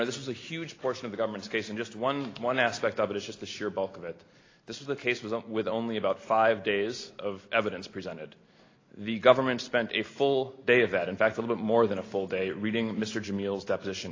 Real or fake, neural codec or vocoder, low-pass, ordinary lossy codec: real; none; 7.2 kHz; AAC, 32 kbps